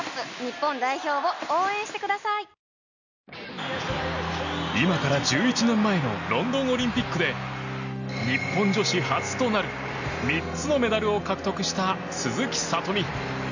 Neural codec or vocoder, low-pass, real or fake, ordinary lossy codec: none; 7.2 kHz; real; none